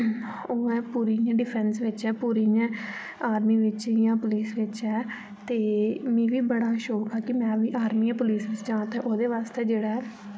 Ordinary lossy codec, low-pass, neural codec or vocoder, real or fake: none; none; none; real